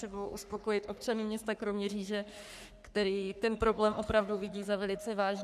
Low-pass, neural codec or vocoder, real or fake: 14.4 kHz; codec, 44.1 kHz, 3.4 kbps, Pupu-Codec; fake